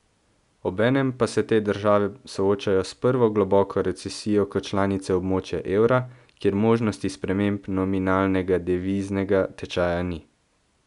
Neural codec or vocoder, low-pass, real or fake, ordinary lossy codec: none; 10.8 kHz; real; none